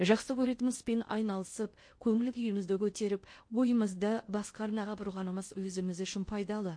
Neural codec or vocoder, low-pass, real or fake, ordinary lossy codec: codec, 16 kHz in and 24 kHz out, 0.6 kbps, FocalCodec, streaming, 2048 codes; 9.9 kHz; fake; MP3, 48 kbps